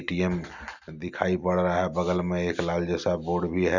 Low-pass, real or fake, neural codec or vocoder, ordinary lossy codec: 7.2 kHz; real; none; none